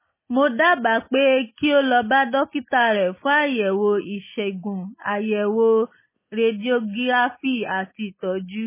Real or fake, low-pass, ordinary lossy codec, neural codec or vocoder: real; 3.6 kHz; MP3, 16 kbps; none